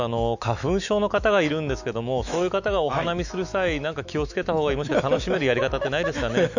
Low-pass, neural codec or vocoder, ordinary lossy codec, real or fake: 7.2 kHz; autoencoder, 48 kHz, 128 numbers a frame, DAC-VAE, trained on Japanese speech; none; fake